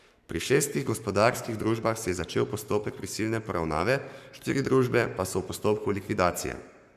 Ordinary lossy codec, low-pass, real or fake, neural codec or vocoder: none; 14.4 kHz; fake; codec, 44.1 kHz, 7.8 kbps, Pupu-Codec